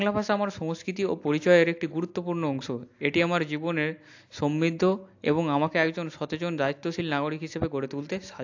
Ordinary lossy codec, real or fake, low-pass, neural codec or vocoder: AAC, 48 kbps; real; 7.2 kHz; none